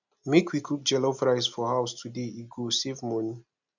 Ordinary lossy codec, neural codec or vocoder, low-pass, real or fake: none; none; 7.2 kHz; real